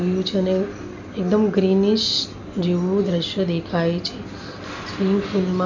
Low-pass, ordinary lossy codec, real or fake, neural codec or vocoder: 7.2 kHz; none; real; none